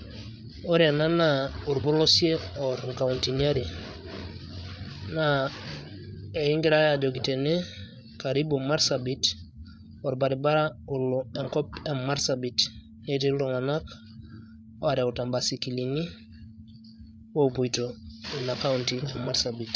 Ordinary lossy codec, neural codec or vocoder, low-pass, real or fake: none; codec, 16 kHz, 8 kbps, FreqCodec, larger model; none; fake